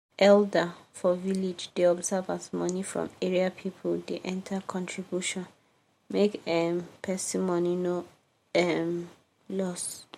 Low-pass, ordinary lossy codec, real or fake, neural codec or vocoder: 19.8 kHz; MP3, 64 kbps; real; none